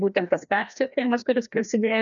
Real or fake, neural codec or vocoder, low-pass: fake; codec, 16 kHz, 1 kbps, FreqCodec, larger model; 7.2 kHz